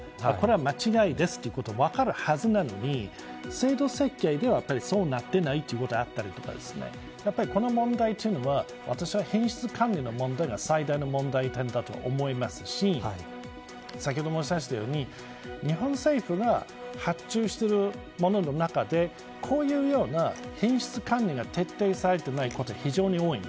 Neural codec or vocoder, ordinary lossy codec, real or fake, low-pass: none; none; real; none